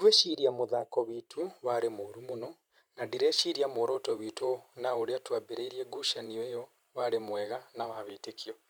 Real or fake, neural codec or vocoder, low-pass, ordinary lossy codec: fake; vocoder, 44.1 kHz, 128 mel bands every 512 samples, BigVGAN v2; none; none